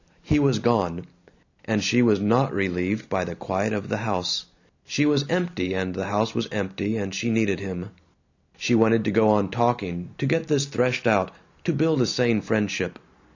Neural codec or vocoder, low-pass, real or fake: none; 7.2 kHz; real